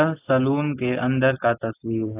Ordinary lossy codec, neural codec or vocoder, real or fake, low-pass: AAC, 24 kbps; none; real; 3.6 kHz